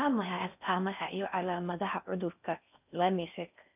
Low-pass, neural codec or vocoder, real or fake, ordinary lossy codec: 3.6 kHz; codec, 16 kHz in and 24 kHz out, 0.6 kbps, FocalCodec, streaming, 4096 codes; fake; none